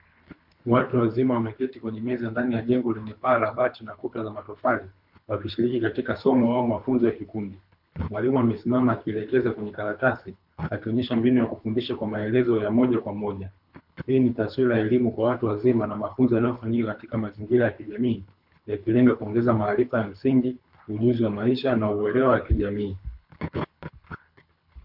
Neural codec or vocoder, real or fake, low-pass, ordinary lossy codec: codec, 24 kHz, 3 kbps, HILCodec; fake; 5.4 kHz; MP3, 48 kbps